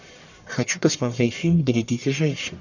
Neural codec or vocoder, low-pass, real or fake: codec, 44.1 kHz, 1.7 kbps, Pupu-Codec; 7.2 kHz; fake